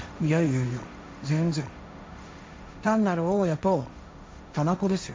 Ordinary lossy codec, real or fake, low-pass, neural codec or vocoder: none; fake; none; codec, 16 kHz, 1.1 kbps, Voila-Tokenizer